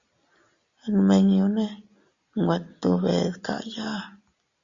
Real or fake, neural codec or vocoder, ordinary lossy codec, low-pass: real; none; Opus, 64 kbps; 7.2 kHz